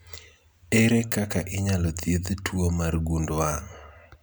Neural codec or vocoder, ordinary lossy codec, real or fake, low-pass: none; none; real; none